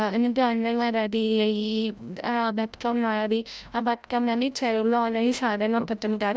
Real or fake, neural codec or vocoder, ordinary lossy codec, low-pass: fake; codec, 16 kHz, 0.5 kbps, FreqCodec, larger model; none; none